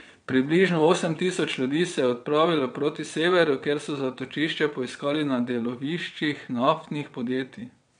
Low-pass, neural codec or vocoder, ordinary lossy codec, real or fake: 9.9 kHz; vocoder, 22.05 kHz, 80 mel bands, WaveNeXt; MP3, 64 kbps; fake